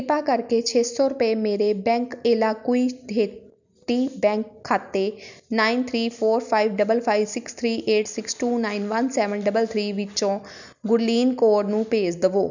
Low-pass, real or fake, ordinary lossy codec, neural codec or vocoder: 7.2 kHz; real; none; none